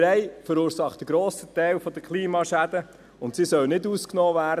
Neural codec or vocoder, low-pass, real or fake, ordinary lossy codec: none; 14.4 kHz; real; none